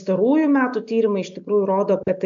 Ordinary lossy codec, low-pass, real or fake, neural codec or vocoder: MP3, 64 kbps; 7.2 kHz; real; none